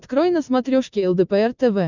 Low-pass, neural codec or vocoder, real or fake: 7.2 kHz; none; real